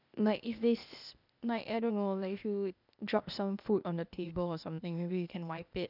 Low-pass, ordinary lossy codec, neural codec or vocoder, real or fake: 5.4 kHz; none; codec, 16 kHz, 0.8 kbps, ZipCodec; fake